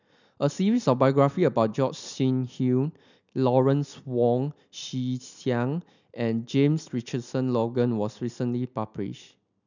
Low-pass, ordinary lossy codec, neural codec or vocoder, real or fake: 7.2 kHz; none; none; real